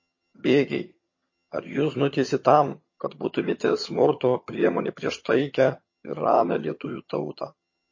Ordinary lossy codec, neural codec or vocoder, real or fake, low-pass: MP3, 32 kbps; vocoder, 22.05 kHz, 80 mel bands, HiFi-GAN; fake; 7.2 kHz